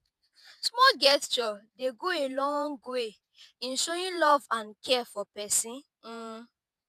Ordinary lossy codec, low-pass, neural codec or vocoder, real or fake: none; 14.4 kHz; vocoder, 48 kHz, 128 mel bands, Vocos; fake